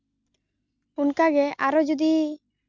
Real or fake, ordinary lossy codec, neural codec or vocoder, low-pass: real; none; none; 7.2 kHz